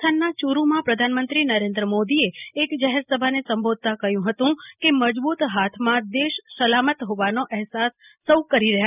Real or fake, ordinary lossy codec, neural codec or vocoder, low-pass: real; none; none; 3.6 kHz